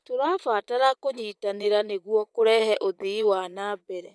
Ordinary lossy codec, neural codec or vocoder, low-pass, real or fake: none; vocoder, 22.05 kHz, 80 mel bands, Vocos; none; fake